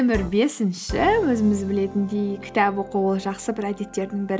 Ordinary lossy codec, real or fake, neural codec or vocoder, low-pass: none; real; none; none